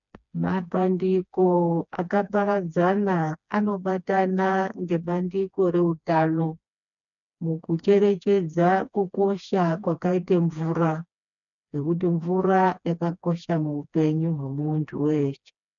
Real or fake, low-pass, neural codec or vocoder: fake; 7.2 kHz; codec, 16 kHz, 2 kbps, FreqCodec, smaller model